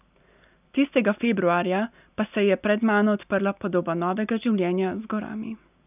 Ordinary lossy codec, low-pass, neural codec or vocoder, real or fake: none; 3.6 kHz; none; real